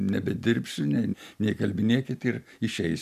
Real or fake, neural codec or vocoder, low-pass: real; none; 14.4 kHz